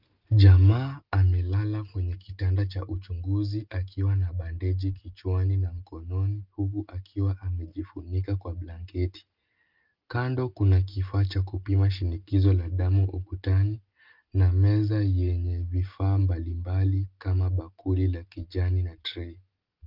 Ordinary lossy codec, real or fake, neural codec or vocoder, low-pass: Opus, 32 kbps; real; none; 5.4 kHz